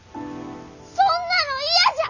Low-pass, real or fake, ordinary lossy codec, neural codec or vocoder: 7.2 kHz; real; none; none